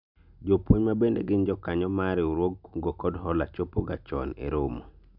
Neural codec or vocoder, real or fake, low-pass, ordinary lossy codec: none; real; 5.4 kHz; none